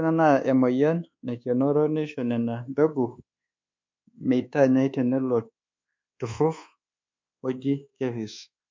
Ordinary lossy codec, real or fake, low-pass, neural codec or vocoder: MP3, 48 kbps; fake; 7.2 kHz; codec, 24 kHz, 1.2 kbps, DualCodec